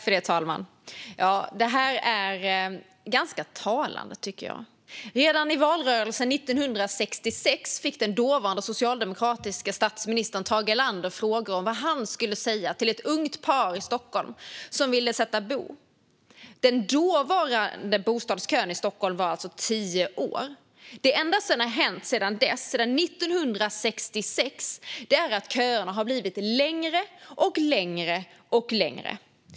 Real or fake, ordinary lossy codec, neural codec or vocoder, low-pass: real; none; none; none